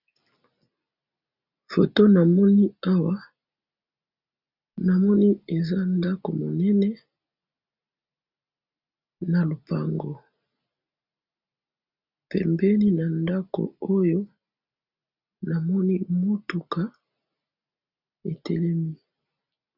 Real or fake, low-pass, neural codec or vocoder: real; 5.4 kHz; none